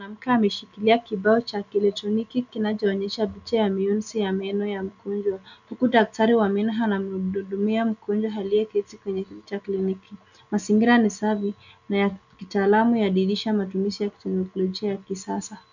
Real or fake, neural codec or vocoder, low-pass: real; none; 7.2 kHz